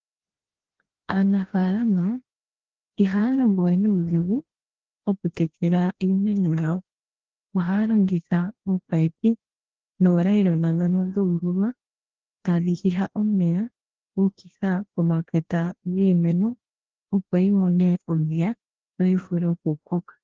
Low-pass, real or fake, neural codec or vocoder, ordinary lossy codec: 7.2 kHz; fake; codec, 16 kHz, 1 kbps, FreqCodec, larger model; Opus, 16 kbps